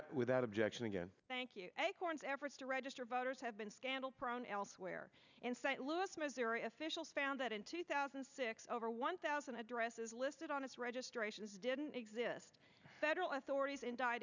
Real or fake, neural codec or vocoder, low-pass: real; none; 7.2 kHz